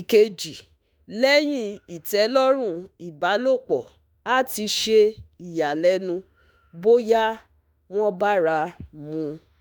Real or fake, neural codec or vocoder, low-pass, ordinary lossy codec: fake; autoencoder, 48 kHz, 32 numbers a frame, DAC-VAE, trained on Japanese speech; none; none